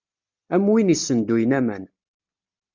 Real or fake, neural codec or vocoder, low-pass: real; none; 7.2 kHz